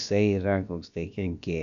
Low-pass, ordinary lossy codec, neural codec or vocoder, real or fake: 7.2 kHz; AAC, 96 kbps; codec, 16 kHz, about 1 kbps, DyCAST, with the encoder's durations; fake